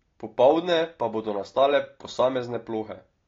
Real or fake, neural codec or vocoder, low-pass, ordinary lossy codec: real; none; 7.2 kHz; AAC, 32 kbps